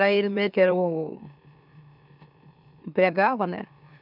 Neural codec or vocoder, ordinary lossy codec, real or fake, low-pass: autoencoder, 44.1 kHz, a latent of 192 numbers a frame, MeloTTS; none; fake; 5.4 kHz